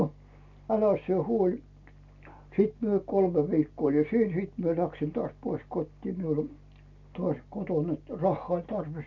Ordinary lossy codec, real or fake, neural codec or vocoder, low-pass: none; real; none; 7.2 kHz